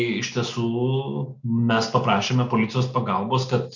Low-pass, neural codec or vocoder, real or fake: 7.2 kHz; none; real